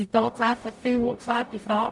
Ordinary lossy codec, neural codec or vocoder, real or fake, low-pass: none; codec, 44.1 kHz, 0.9 kbps, DAC; fake; 10.8 kHz